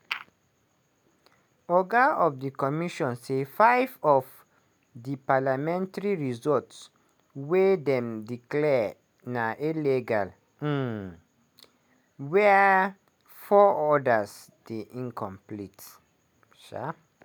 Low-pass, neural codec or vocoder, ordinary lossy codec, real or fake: none; none; none; real